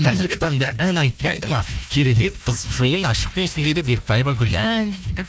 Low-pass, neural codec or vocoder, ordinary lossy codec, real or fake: none; codec, 16 kHz, 1 kbps, FunCodec, trained on Chinese and English, 50 frames a second; none; fake